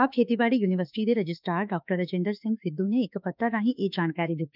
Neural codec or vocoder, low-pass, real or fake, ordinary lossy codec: autoencoder, 48 kHz, 32 numbers a frame, DAC-VAE, trained on Japanese speech; 5.4 kHz; fake; none